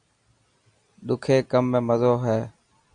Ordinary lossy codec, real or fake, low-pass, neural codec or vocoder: Opus, 64 kbps; real; 9.9 kHz; none